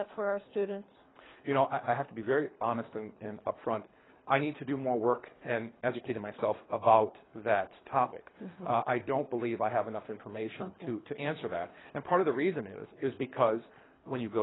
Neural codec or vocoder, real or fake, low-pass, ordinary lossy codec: codec, 24 kHz, 3 kbps, HILCodec; fake; 7.2 kHz; AAC, 16 kbps